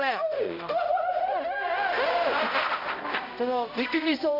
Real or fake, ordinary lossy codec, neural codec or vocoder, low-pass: fake; none; codec, 16 kHz, 0.5 kbps, X-Codec, HuBERT features, trained on balanced general audio; 5.4 kHz